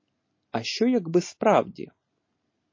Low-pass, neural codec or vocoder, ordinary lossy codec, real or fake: 7.2 kHz; none; MP3, 32 kbps; real